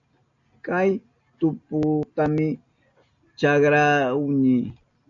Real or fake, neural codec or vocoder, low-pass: real; none; 7.2 kHz